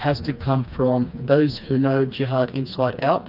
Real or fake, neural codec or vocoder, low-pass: fake; codec, 16 kHz, 2 kbps, FreqCodec, smaller model; 5.4 kHz